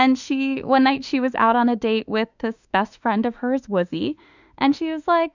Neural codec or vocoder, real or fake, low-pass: autoencoder, 48 kHz, 32 numbers a frame, DAC-VAE, trained on Japanese speech; fake; 7.2 kHz